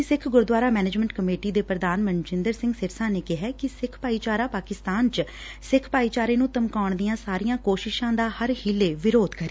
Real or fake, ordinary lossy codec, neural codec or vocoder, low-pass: real; none; none; none